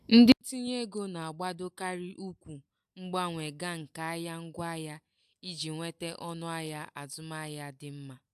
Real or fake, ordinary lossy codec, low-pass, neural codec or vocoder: real; AAC, 96 kbps; 14.4 kHz; none